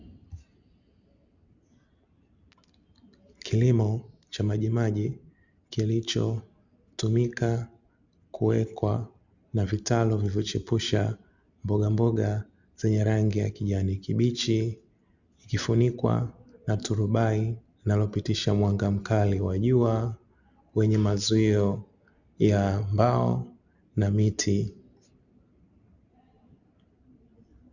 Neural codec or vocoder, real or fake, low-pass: none; real; 7.2 kHz